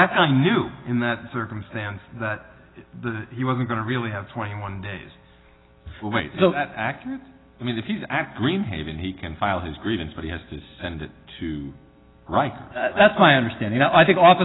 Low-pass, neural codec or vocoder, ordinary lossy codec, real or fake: 7.2 kHz; none; AAC, 16 kbps; real